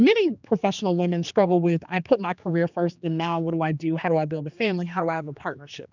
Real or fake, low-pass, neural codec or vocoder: fake; 7.2 kHz; codec, 16 kHz, 2 kbps, X-Codec, HuBERT features, trained on general audio